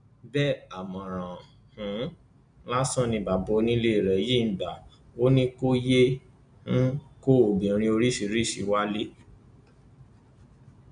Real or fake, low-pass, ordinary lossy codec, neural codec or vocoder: real; 9.9 kHz; none; none